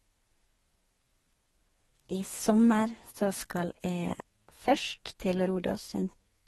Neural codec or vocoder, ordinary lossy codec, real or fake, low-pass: codec, 32 kHz, 1.9 kbps, SNAC; AAC, 32 kbps; fake; 14.4 kHz